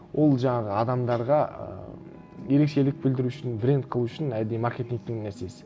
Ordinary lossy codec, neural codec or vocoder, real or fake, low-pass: none; none; real; none